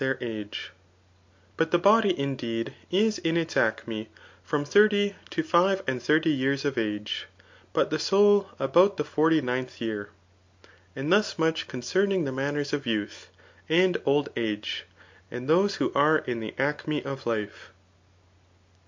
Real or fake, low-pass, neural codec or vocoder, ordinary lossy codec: real; 7.2 kHz; none; MP3, 48 kbps